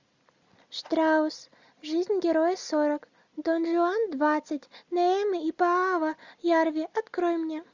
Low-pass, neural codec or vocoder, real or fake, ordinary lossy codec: 7.2 kHz; none; real; Opus, 64 kbps